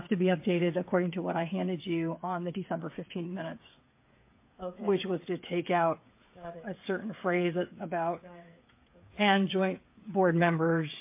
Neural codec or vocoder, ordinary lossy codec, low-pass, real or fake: codec, 16 kHz, 8 kbps, FreqCodec, smaller model; MP3, 24 kbps; 3.6 kHz; fake